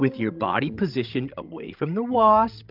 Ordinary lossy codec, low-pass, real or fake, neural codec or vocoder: Opus, 24 kbps; 5.4 kHz; fake; codec, 16 kHz, 16 kbps, FreqCodec, larger model